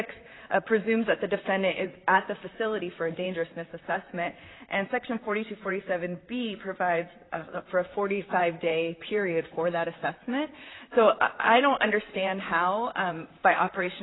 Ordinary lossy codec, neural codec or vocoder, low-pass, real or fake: AAC, 16 kbps; vocoder, 44.1 kHz, 128 mel bands, Pupu-Vocoder; 7.2 kHz; fake